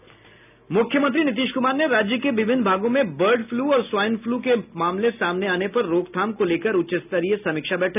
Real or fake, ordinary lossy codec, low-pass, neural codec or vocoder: real; none; 3.6 kHz; none